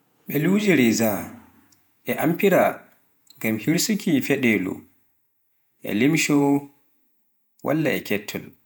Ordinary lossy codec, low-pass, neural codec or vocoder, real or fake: none; none; vocoder, 48 kHz, 128 mel bands, Vocos; fake